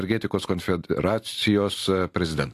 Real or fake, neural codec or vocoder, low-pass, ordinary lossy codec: real; none; 14.4 kHz; AAC, 64 kbps